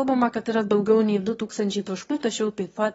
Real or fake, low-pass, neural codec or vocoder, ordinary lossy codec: fake; 9.9 kHz; autoencoder, 22.05 kHz, a latent of 192 numbers a frame, VITS, trained on one speaker; AAC, 24 kbps